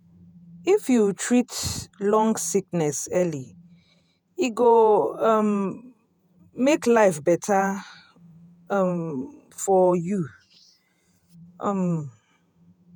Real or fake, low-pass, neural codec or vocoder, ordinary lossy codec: fake; none; vocoder, 48 kHz, 128 mel bands, Vocos; none